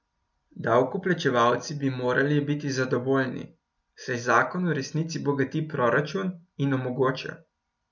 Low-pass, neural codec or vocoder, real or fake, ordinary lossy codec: 7.2 kHz; none; real; none